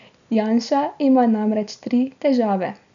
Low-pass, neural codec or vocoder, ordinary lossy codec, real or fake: 7.2 kHz; none; none; real